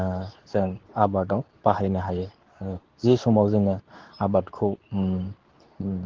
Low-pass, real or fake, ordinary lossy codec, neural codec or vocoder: 7.2 kHz; fake; Opus, 16 kbps; codec, 24 kHz, 0.9 kbps, WavTokenizer, medium speech release version 2